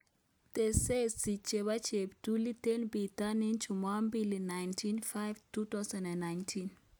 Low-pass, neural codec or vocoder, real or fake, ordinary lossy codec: none; none; real; none